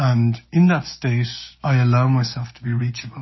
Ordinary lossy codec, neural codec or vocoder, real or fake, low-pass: MP3, 24 kbps; codec, 16 kHz, 6 kbps, DAC; fake; 7.2 kHz